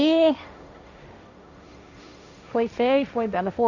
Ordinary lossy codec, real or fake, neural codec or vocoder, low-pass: none; fake; codec, 16 kHz, 1.1 kbps, Voila-Tokenizer; 7.2 kHz